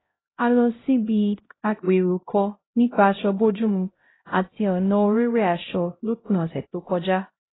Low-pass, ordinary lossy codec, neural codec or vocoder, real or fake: 7.2 kHz; AAC, 16 kbps; codec, 16 kHz, 0.5 kbps, X-Codec, HuBERT features, trained on LibriSpeech; fake